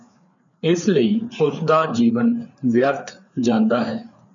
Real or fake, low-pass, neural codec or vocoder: fake; 7.2 kHz; codec, 16 kHz, 4 kbps, FreqCodec, larger model